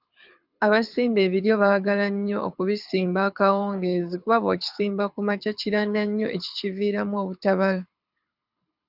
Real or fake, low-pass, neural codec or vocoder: fake; 5.4 kHz; codec, 24 kHz, 6 kbps, HILCodec